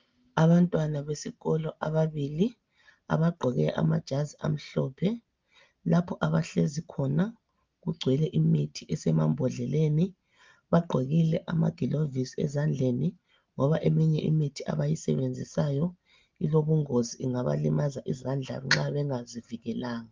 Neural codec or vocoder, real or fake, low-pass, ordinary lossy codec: none; real; 7.2 kHz; Opus, 32 kbps